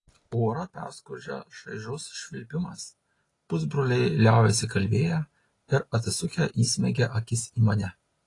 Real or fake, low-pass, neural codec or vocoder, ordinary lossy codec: real; 10.8 kHz; none; AAC, 32 kbps